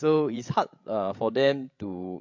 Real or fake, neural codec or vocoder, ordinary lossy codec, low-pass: fake; codec, 16 kHz, 16 kbps, FreqCodec, larger model; MP3, 48 kbps; 7.2 kHz